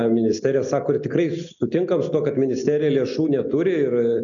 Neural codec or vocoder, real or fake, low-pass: none; real; 7.2 kHz